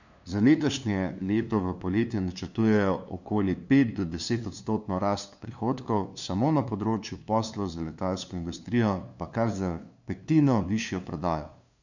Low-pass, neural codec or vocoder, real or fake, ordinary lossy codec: 7.2 kHz; codec, 16 kHz, 2 kbps, FunCodec, trained on LibriTTS, 25 frames a second; fake; none